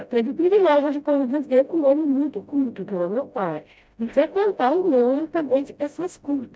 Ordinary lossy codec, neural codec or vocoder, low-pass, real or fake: none; codec, 16 kHz, 0.5 kbps, FreqCodec, smaller model; none; fake